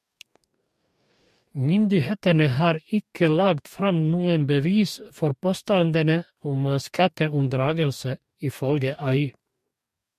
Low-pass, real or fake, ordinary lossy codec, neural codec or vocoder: 14.4 kHz; fake; MP3, 64 kbps; codec, 44.1 kHz, 2.6 kbps, DAC